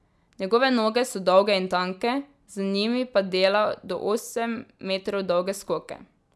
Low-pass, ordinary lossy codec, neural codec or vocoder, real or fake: none; none; none; real